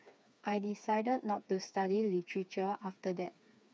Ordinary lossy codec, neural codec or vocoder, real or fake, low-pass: none; codec, 16 kHz, 4 kbps, FreqCodec, smaller model; fake; none